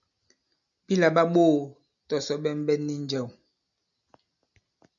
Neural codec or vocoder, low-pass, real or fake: none; 7.2 kHz; real